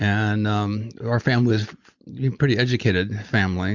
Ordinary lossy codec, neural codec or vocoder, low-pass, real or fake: Opus, 64 kbps; none; 7.2 kHz; real